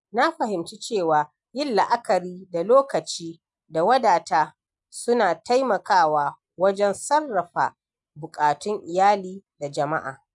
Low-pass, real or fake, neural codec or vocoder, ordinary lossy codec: 10.8 kHz; real; none; none